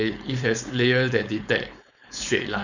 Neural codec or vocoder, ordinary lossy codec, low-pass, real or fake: codec, 16 kHz, 4.8 kbps, FACodec; none; 7.2 kHz; fake